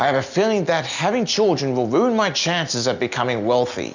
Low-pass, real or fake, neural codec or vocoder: 7.2 kHz; real; none